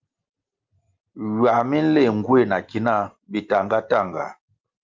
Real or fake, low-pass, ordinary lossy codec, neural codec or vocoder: real; 7.2 kHz; Opus, 24 kbps; none